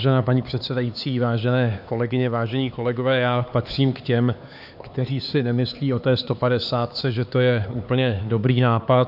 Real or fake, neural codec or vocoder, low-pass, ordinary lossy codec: fake; codec, 16 kHz, 4 kbps, X-Codec, HuBERT features, trained on LibriSpeech; 5.4 kHz; AAC, 48 kbps